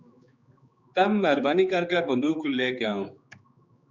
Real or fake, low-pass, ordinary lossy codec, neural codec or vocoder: fake; 7.2 kHz; Opus, 64 kbps; codec, 16 kHz, 4 kbps, X-Codec, HuBERT features, trained on general audio